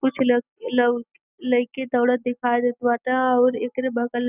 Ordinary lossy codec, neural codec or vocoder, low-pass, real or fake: none; none; 3.6 kHz; real